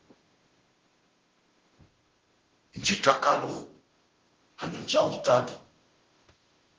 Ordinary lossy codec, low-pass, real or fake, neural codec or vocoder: Opus, 16 kbps; 7.2 kHz; fake; codec, 16 kHz, 0.5 kbps, FunCodec, trained on Chinese and English, 25 frames a second